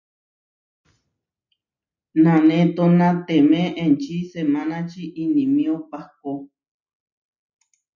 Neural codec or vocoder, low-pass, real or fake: none; 7.2 kHz; real